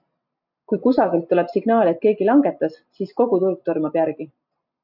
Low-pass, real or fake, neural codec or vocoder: 5.4 kHz; real; none